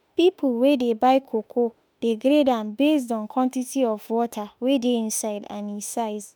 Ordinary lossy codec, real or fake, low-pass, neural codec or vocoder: none; fake; none; autoencoder, 48 kHz, 32 numbers a frame, DAC-VAE, trained on Japanese speech